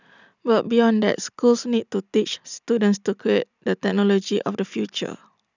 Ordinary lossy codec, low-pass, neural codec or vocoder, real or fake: none; 7.2 kHz; none; real